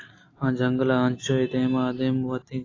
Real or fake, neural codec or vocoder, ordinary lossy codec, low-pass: real; none; AAC, 32 kbps; 7.2 kHz